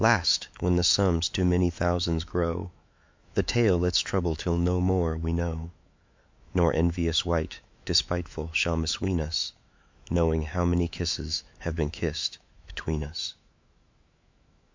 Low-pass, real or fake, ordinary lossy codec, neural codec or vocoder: 7.2 kHz; fake; MP3, 64 kbps; autoencoder, 48 kHz, 128 numbers a frame, DAC-VAE, trained on Japanese speech